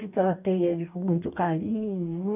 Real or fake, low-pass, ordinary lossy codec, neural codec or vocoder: fake; 3.6 kHz; none; codec, 44.1 kHz, 2.6 kbps, DAC